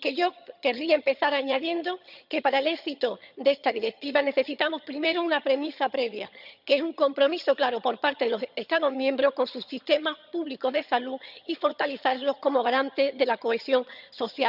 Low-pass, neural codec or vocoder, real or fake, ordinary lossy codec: 5.4 kHz; vocoder, 22.05 kHz, 80 mel bands, HiFi-GAN; fake; none